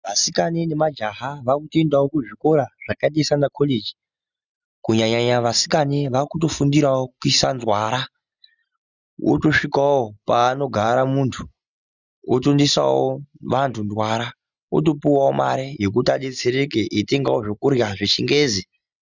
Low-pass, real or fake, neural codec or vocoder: 7.2 kHz; real; none